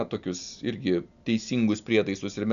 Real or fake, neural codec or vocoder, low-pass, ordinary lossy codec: real; none; 7.2 kHz; MP3, 96 kbps